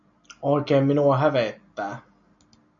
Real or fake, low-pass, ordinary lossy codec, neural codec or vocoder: real; 7.2 kHz; MP3, 64 kbps; none